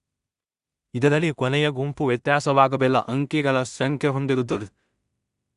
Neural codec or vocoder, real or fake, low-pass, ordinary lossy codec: codec, 16 kHz in and 24 kHz out, 0.4 kbps, LongCat-Audio-Codec, two codebook decoder; fake; 10.8 kHz; Opus, 64 kbps